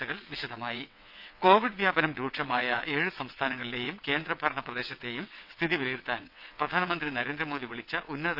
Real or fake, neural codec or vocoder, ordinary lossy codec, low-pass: fake; vocoder, 22.05 kHz, 80 mel bands, WaveNeXt; none; 5.4 kHz